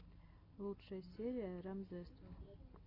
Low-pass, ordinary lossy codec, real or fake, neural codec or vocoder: 5.4 kHz; MP3, 48 kbps; real; none